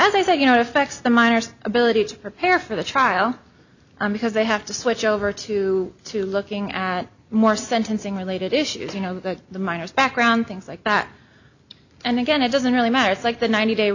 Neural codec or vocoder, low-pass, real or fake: none; 7.2 kHz; real